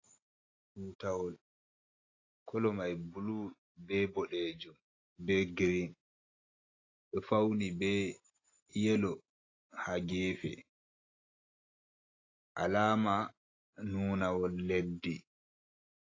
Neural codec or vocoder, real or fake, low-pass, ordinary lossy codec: none; real; 7.2 kHz; AAC, 32 kbps